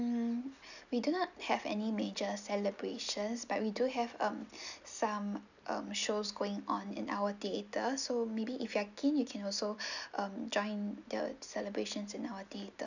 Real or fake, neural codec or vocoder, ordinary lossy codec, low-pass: real; none; none; 7.2 kHz